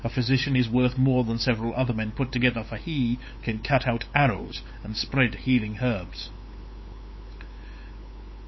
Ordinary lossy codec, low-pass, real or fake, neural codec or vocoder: MP3, 24 kbps; 7.2 kHz; fake; codec, 16 kHz, 8 kbps, FunCodec, trained on LibriTTS, 25 frames a second